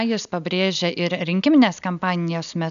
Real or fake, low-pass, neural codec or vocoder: real; 7.2 kHz; none